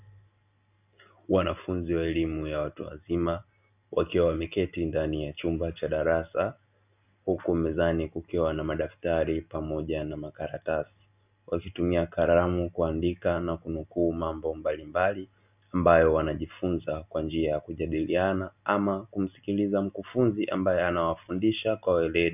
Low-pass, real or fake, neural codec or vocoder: 3.6 kHz; real; none